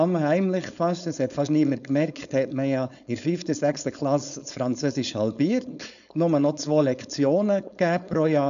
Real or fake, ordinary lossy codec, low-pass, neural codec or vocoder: fake; none; 7.2 kHz; codec, 16 kHz, 4.8 kbps, FACodec